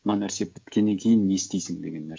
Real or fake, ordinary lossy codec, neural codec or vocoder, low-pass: fake; none; codec, 16 kHz, 16 kbps, FreqCodec, smaller model; none